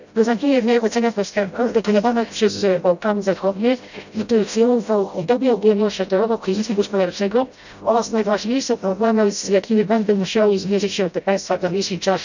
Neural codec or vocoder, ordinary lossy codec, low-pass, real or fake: codec, 16 kHz, 0.5 kbps, FreqCodec, smaller model; none; 7.2 kHz; fake